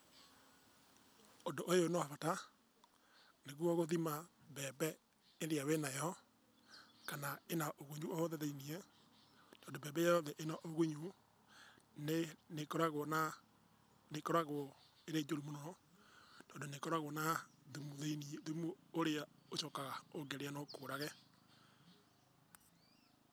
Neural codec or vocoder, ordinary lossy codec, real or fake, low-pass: none; none; real; none